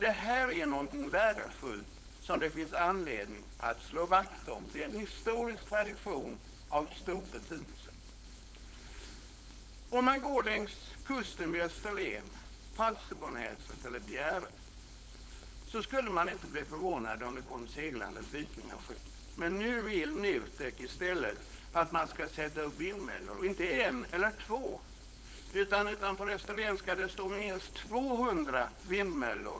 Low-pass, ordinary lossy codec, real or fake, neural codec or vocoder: none; none; fake; codec, 16 kHz, 4.8 kbps, FACodec